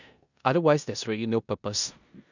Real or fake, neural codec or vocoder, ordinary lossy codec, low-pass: fake; codec, 16 kHz in and 24 kHz out, 0.9 kbps, LongCat-Audio-Codec, four codebook decoder; none; 7.2 kHz